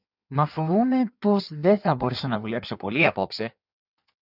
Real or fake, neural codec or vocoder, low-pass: fake; codec, 16 kHz in and 24 kHz out, 1.1 kbps, FireRedTTS-2 codec; 5.4 kHz